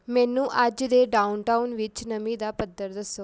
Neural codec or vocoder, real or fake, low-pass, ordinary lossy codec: none; real; none; none